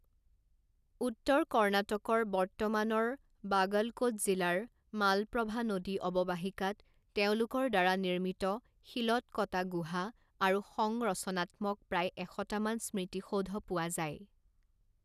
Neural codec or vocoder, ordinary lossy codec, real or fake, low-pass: none; none; real; 14.4 kHz